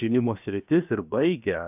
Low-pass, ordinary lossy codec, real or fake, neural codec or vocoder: 3.6 kHz; AAC, 32 kbps; fake; codec, 16 kHz, 0.7 kbps, FocalCodec